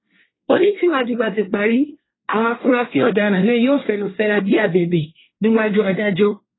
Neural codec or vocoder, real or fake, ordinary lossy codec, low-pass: codec, 24 kHz, 1 kbps, SNAC; fake; AAC, 16 kbps; 7.2 kHz